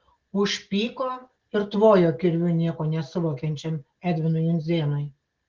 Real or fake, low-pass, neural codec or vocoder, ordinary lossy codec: real; 7.2 kHz; none; Opus, 16 kbps